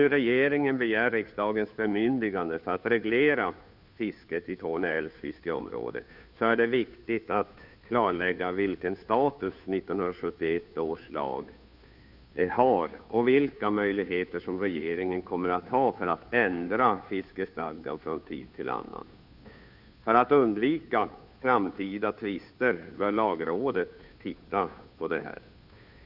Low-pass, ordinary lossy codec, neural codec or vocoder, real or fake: 5.4 kHz; none; codec, 16 kHz, 2 kbps, FunCodec, trained on Chinese and English, 25 frames a second; fake